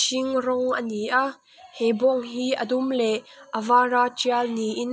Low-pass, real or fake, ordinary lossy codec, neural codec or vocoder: none; real; none; none